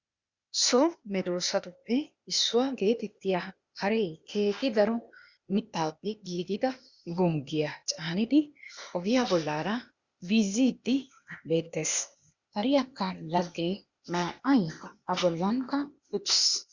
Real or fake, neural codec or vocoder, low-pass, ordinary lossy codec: fake; codec, 16 kHz, 0.8 kbps, ZipCodec; 7.2 kHz; Opus, 64 kbps